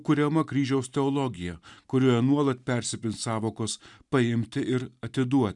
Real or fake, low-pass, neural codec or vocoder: real; 10.8 kHz; none